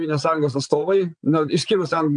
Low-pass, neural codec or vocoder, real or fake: 9.9 kHz; vocoder, 22.05 kHz, 80 mel bands, Vocos; fake